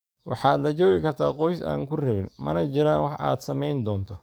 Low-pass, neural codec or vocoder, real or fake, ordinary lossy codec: none; codec, 44.1 kHz, 7.8 kbps, DAC; fake; none